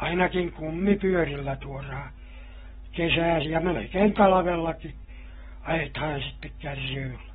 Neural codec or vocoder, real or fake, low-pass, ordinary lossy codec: none; real; 7.2 kHz; AAC, 16 kbps